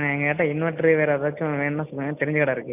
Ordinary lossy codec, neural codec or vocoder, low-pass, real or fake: none; none; 3.6 kHz; real